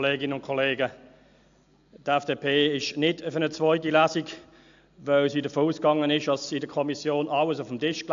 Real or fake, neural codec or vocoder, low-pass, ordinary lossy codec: real; none; 7.2 kHz; AAC, 64 kbps